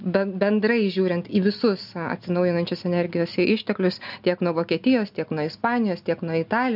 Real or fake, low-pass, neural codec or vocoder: real; 5.4 kHz; none